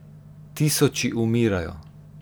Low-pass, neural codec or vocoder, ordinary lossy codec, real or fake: none; none; none; real